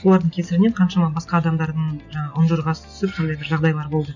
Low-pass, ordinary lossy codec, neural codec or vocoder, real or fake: 7.2 kHz; none; none; real